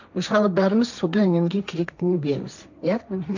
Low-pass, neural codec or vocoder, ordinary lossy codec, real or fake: 7.2 kHz; codec, 16 kHz, 1.1 kbps, Voila-Tokenizer; none; fake